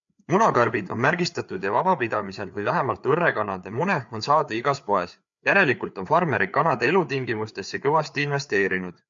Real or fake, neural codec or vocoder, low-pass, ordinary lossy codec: fake; codec, 16 kHz, 4 kbps, FreqCodec, larger model; 7.2 kHz; MP3, 64 kbps